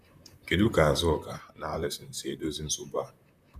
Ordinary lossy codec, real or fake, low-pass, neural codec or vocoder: none; fake; 14.4 kHz; vocoder, 44.1 kHz, 128 mel bands, Pupu-Vocoder